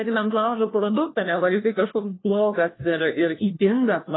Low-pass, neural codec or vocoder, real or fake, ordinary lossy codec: 7.2 kHz; codec, 16 kHz, 1 kbps, FunCodec, trained on LibriTTS, 50 frames a second; fake; AAC, 16 kbps